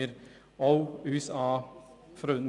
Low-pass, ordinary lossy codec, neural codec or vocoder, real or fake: 10.8 kHz; none; none; real